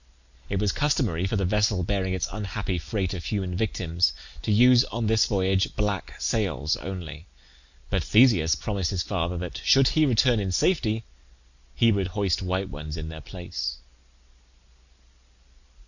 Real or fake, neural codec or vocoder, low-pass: real; none; 7.2 kHz